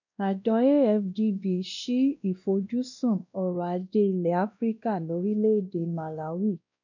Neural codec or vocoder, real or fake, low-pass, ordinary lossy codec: codec, 16 kHz, 1 kbps, X-Codec, WavLM features, trained on Multilingual LibriSpeech; fake; 7.2 kHz; none